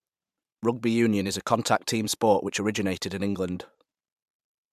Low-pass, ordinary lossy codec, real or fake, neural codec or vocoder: 14.4 kHz; MP3, 96 kbps; fake; vocoder, 44.1 kHz, 128 mel bands every 512 samples, BigVGAN v2